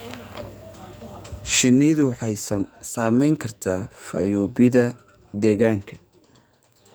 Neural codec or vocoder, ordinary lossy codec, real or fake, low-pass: codec, 44.1 kHz, 2.6 kbps, SNAC; none; fake; none